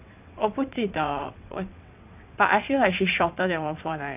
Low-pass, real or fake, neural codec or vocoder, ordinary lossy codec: 3.6 kHz; fake; vocoder, 22.05 kHz, 80 mel bands, WaveNeXt; none